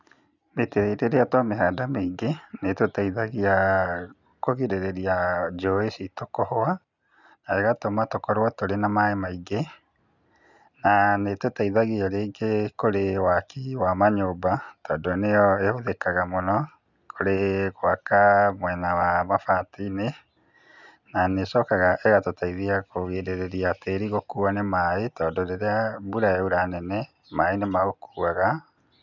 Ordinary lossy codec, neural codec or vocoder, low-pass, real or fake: none; none; 7.2 kHz; real